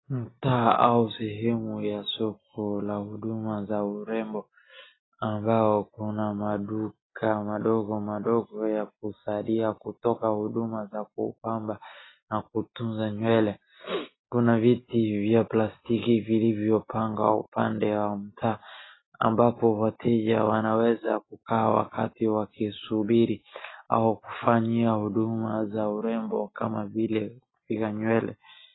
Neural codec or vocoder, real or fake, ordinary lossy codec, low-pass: none; real; AAC, 16 kbps; 7.2 kHz